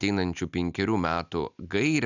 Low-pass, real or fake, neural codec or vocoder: 7.2 kHz; real; none